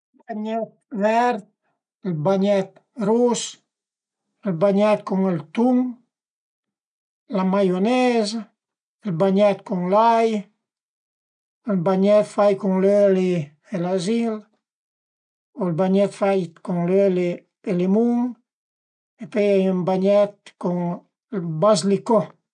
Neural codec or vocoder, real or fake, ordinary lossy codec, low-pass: none; real; none; 9.9 kHz